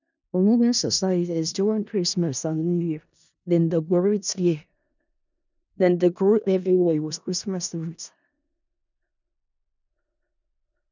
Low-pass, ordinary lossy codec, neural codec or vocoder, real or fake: 7.2 kHz; none; codec, 16 kHz in and 24 kHz out, 0.4 kbps, LongCat-Audio-Codec, four codebook decoder; fake